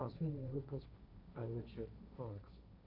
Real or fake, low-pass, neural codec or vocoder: fake; 5.4 kHz; codec, 16 kHz, 1.1 kbps, Voila-Tokenizer